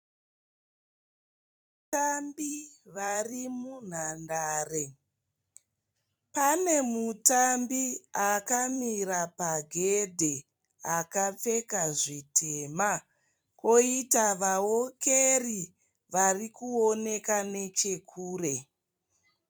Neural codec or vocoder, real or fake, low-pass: vocoder, 44.1 kHz, 128 mel bands every 256 samples, BigVGAN v2; fake; 19.8 kHz